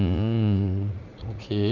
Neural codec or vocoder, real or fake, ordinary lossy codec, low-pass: vocoder, 44.1 kHz, 80 mel bands, Vocos; fake; none; 7.2 kHz